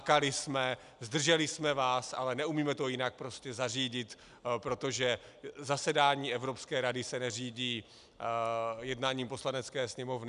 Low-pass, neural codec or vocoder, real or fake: 10.8 kHz; none; real